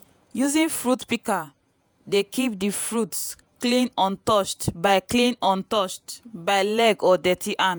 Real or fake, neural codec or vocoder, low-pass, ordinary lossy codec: fake; vocoder, 48 kHz, 128 mel bands, Vocos; none; none